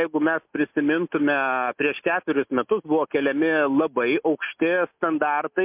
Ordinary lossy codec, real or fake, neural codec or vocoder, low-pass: MP3, 32 kbps; real; none; 3.6 kHz